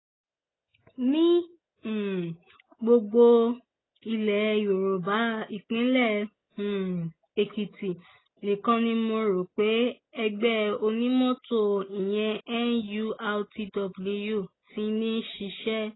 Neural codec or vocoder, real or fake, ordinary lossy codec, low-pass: none; real; AAC, 16 kbps; 7.2 kHz